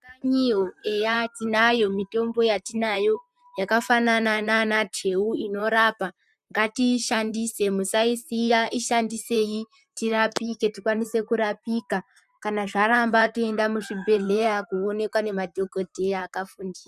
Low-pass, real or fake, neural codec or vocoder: 14.4 kHz; fake; vocoder, 44.1 kHz, 128 mel bands, Pupu-Vocoder